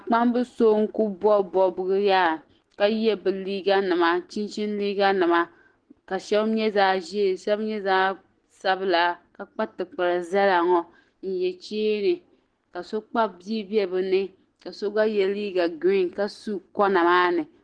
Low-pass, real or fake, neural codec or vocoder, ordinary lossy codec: 9.9 kHz; real; none; Opus, 16 kbps